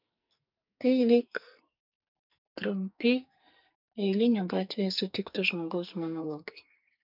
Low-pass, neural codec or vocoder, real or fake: 5.4 kHz; codec, 44.1 kHz, 2.6 kbps, SNAC; fake